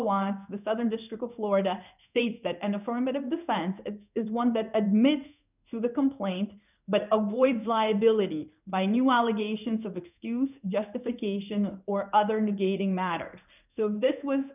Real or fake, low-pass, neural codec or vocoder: fake; 3.6 kHz; codec, 16 kHz in and 24 kHz out, 1 kbps, XY-Tokenizer